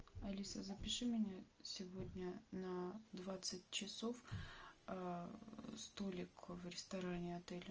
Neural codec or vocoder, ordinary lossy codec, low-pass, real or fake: none; Opus, 24 kbps; 7.2 kHz; real